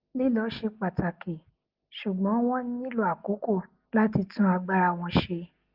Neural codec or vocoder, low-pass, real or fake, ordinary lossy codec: none; 5.4 kHz; real; Opus, 32 kbps